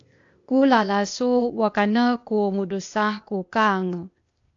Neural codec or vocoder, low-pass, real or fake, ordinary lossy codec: codec, 16 kHz, 0.8 kbps, ZipCodec; 7.2 kHz; fake; AAC, 64 kbps